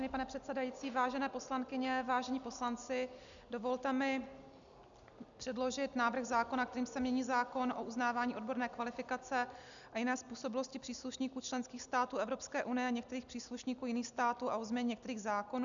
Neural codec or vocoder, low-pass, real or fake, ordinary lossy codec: none; 7.2 kHz; real; MP3, 96 kbps